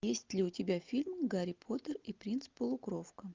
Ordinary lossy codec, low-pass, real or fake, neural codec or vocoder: Opus, 24 kbps; 7.2 kHz; real; none